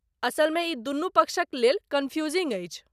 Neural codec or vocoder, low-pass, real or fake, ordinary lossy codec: none; 14.4 kHz; real; none